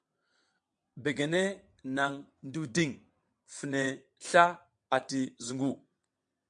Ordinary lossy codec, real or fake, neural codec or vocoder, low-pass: MP3, 64 kbps; fake; vocoder, 22.05 kHz, 80 mel bands, WaveNeXt; 9.9 kHz